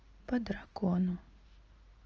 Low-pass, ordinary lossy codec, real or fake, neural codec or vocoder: 7.2 kHz; Opus, 24 kbps; real; none